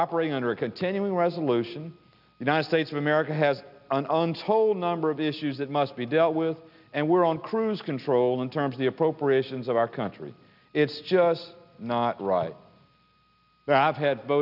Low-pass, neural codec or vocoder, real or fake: 5.4 kHz; none; real